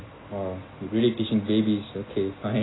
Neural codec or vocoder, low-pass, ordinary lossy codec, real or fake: none; 7.2 kHz; AAC, 16 kbps; real